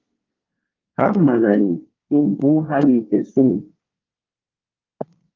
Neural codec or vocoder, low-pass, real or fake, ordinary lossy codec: codec, 24 kHz, 1 kbps, SNAC; 7.2 kHz; fake; Opus, 32 kbps